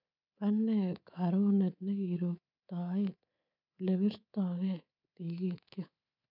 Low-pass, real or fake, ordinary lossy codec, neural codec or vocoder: 5.4 kHz; fake; none; codec, 24 kHz, 3.1 kbps, DualCodec